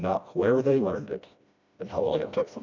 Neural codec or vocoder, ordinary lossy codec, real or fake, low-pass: codec, 16 kHz, 1 kbps, FreqCodec, smaller model; MP3, 64 kbps; fake; 7.2 kHz